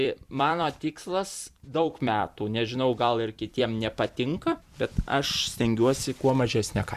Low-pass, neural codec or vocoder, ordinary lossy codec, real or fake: 14.4 kHz; vocoder, 44.1 kHz, 128 mel bands every 256 samples, BigVGAN v2; Opus, 64 kbps; fake